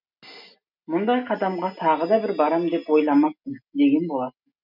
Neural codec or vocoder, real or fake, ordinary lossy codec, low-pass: none; real; none; 5.4 kHz